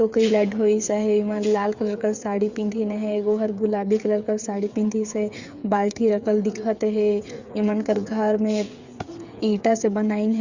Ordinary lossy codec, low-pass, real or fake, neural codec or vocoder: Opus, 64 kbps; 7.2 kHz; fake; codec, 16 kHz, 8 kbps, FreqCodec, smaller model